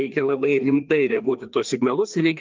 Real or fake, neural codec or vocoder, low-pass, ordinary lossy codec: fake; codec, 16 kHz, 2 kbps, FreqCodec, larger model; 7.2 kHz; Opus, 32 kbps